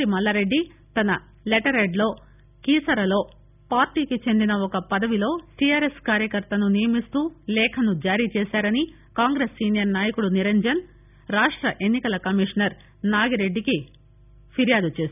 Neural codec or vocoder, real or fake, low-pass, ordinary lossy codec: none; real; 3.6 kHz; none